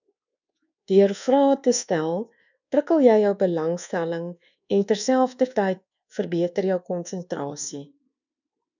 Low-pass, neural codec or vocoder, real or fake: 7.2 kHz; codec, 24 kHz, 1.2 kbps, DualCodec; fake